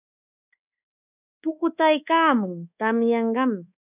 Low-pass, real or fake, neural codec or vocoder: 3.6 kHz; fake; codec, 24 kHz, 1.2 kbps, DualCodec